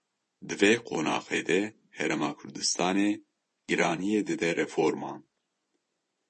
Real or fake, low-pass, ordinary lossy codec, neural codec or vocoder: fake; 10.8 kHz; MP3, 32 kbps; vocoder, 24 kHz, 100 mel bands, Vocos